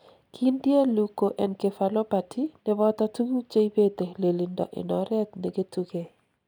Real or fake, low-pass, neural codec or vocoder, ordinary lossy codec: real; 19.8 kHz; none; none